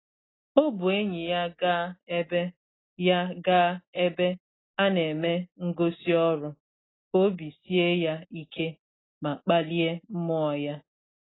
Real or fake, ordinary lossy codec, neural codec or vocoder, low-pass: real; AAC, 16 kbps; none; 7.2 kHz